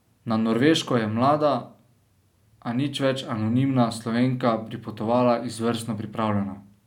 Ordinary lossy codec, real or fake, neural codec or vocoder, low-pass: none; real; none; 19.8 kHz